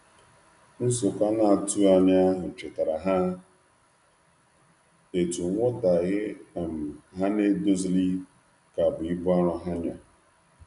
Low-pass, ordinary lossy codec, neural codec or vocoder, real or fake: 10.8 kHz; none; none; real